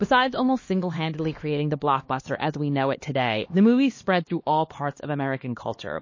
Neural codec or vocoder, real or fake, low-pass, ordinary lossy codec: codec, 16 kHz, 2 kbps, X-Codec, HuBERT features, trained on LibriSpeech; fake; 7.2 kHz; MP3, 32 kbps